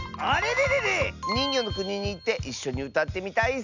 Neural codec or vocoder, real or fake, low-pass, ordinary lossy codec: none; real; 7.2 kHz; none